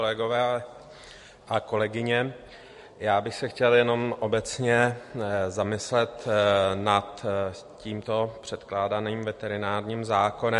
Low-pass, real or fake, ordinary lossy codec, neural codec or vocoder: 14.4 kHz; real; MP3, 48 kbps; none